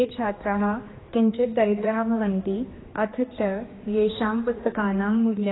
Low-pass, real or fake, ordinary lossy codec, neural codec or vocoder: 7.2 kHz; fake; AAC, 16 kbps; codec, 16 kHz, 2 kbps, X-Codec, HuBERT features, trained on general audio